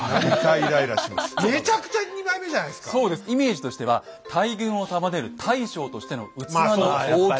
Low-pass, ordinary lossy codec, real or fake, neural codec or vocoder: none; none; real; none